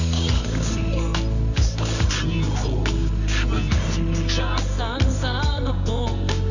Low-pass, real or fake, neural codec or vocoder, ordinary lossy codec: 7.2 kHz; fake; codec, 24 kHz, 0.9 kbps, WavTokenizer, medium music audio release; none